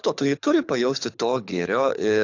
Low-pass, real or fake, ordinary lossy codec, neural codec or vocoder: 7.2 kHz; fake; AAC, 48 kbps; codec, 24 kHz, 6 kbps, HILCodec